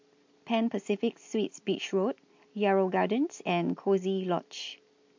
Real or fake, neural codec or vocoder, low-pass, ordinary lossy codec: real; none; 7.2 kHz; MP3, 48 kbps